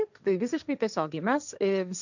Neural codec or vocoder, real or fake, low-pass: codec, 16 kHz, 1.1 kbps, Voila-Tokenizer; fake; 7.2 kHz